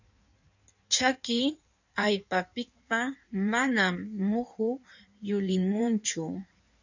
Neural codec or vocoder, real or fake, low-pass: codec, 16 kHz in and 24 kHz out, 1.1 kbps, FireRedTTS-2 codec; fake; 7.2 kHz